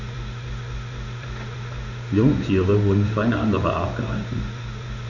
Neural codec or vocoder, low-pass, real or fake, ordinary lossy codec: codec, 16 kHz in and 24 kHz out, 1 kbps, XY-Tokenizer; 7.2 kHz; fake; none